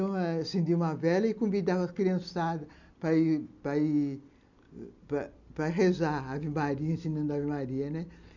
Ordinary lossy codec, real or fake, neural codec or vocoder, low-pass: none; real; none; 7.2 kHz